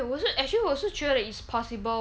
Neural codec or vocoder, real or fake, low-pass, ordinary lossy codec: none; real; none; none